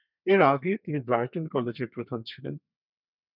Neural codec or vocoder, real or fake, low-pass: codec, 32 kHz, 1.9 kbps, SNAC; fake; 5.4 kHz